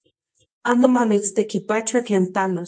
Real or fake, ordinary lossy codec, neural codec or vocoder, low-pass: fake; MP3, 48 kbps; codec, 24 kHz, 0.9 kbps, WavTokenizer, medium music audio release; 9.9 kHz